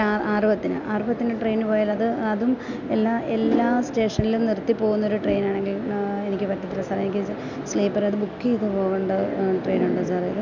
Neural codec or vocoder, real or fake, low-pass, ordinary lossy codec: none; real; 7.2 kHz; none